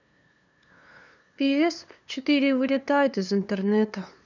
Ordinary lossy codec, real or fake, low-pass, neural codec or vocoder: none; fake; 7.2 kHz; codec, 16 kHz, 2 kbps, FunCodec, trained on LibriTTS, 25 frames a second